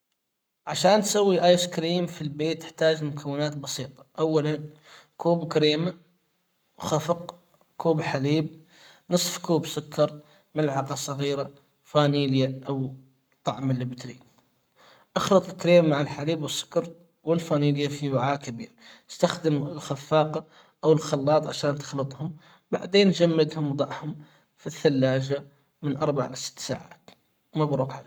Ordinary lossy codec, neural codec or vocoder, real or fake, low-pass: none; codec, 44.1 kHz, 7.8 kbps, Pupu-Codec; fake; none